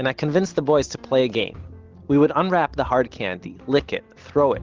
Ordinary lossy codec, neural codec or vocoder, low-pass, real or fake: Opus, 16 kbps; none; 7.2 kHz; real